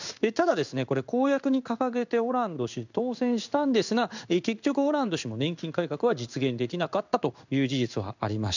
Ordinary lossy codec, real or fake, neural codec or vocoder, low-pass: none; fake; codec, 16 kHz in and 24 kHz out, 1 kbps, XY-Tokenizer; 7.2 kHz